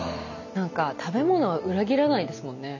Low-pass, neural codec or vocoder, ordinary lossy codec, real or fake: 7.2 kHz; none; none; real